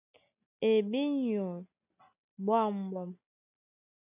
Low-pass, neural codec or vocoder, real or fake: 3.6 kHz; none; real